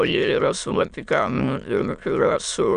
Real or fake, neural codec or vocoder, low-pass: fake; autoencoder, 22.05 kHz, a latent of 192 numbers a frame, VITS, trained on many speakers; 9.9 kHz